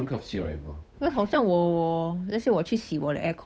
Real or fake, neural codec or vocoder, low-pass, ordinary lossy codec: fake; codec, 16 kHz, 8 kbps, FunCodec, trained on Chinese and English, 25 frames a second; none; none